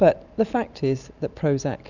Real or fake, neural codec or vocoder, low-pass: real; none; 7.2 kHz